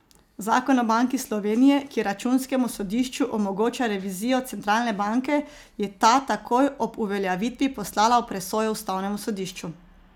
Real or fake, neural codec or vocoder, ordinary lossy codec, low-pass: real; none; none; 19.8 kHz